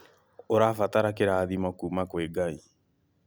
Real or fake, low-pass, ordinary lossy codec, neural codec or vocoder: real; none; none; none